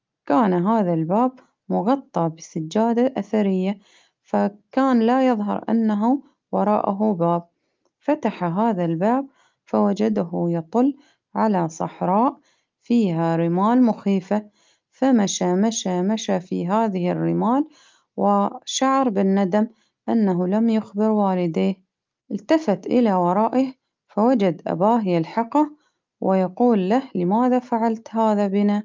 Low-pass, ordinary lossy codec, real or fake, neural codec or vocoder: 7.2 kHz; Opus, 24 kbps; real; none